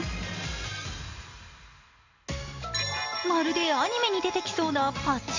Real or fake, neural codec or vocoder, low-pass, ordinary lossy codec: real; none; 7.2 kHz; AAC, 48 kbps